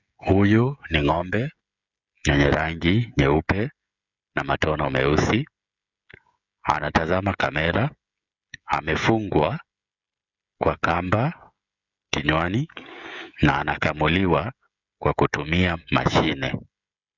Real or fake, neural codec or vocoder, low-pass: fake; codec, 16 kHz, 16 kbps, FreqCodec, smaller model; 7.2 kHz